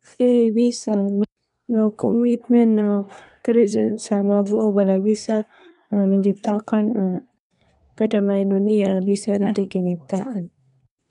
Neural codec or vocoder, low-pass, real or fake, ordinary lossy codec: codec, 24 kHz, 1 kbps, SNAC; 10.8 kHz; fake; none